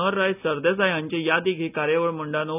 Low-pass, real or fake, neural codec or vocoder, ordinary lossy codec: 3.6 kHz; real; none; none